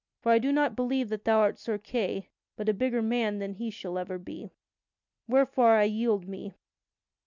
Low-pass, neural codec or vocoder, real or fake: 7.2 kHz; none; real